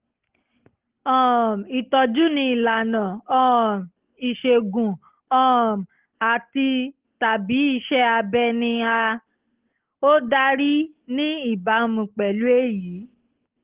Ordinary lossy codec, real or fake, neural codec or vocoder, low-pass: Opus, 16 kbps; real; none; 3.6 kHz